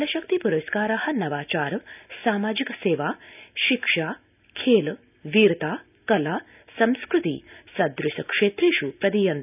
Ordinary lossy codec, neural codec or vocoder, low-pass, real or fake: none; none; 3.6 kHz; real